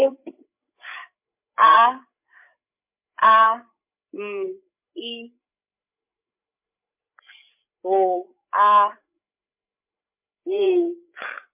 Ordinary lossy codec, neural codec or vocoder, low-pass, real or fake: MP3, 32 kbps; codec, 16 kHz, 8 kbps, FreqCodec, larger model; 3.6 kHz; fake